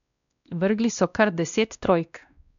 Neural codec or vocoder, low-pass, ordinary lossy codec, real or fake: codec, 16 kHz, 1 kbps, X-Codec, WavLM features, trained on Multilingual LibriSpeech; 7.2 kHz; none; fake